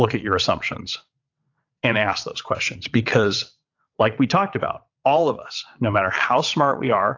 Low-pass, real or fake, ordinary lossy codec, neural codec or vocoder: 7.2 kHz; fake; AAC, 48 kbps; vocoder, 22.05 kHz, 80 mel bands, WaveNeXt